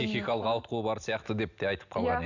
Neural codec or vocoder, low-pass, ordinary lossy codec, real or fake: none; 7.2 kHz; none; real